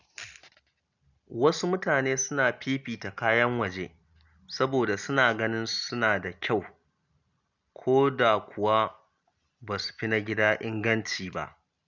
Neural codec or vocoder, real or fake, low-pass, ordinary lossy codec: none; real; 7.2 kHz; none